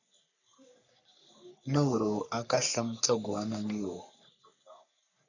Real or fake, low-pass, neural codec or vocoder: fake; 7.2 kHz; codec, 44.1 kHz, 3.4 kbps, Pupu-Codec